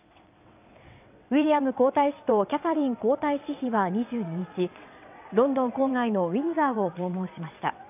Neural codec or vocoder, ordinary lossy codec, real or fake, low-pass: vocoder, 22.05 kHz, 80 mel bands, WaveNeXt; none; fake; 3.6 kHz